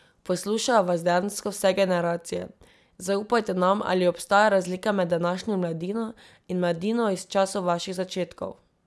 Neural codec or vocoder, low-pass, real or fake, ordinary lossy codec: none; none; real; none